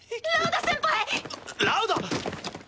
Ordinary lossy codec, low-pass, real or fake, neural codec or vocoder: none; none; real; none